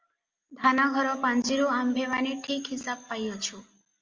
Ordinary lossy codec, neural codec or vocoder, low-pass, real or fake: Opus, 32 kbps; none; 7.2 kHz; real